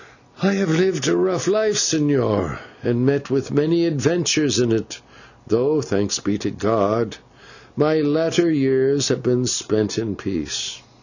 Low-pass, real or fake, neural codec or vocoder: 7.2 kHz; real; none